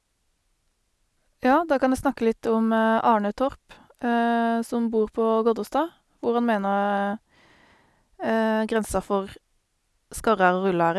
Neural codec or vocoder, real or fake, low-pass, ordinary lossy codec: none; real; none; none